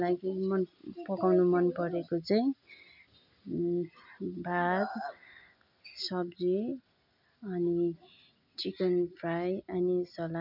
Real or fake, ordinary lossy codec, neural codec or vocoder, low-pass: real; none; none; 5.4 kHz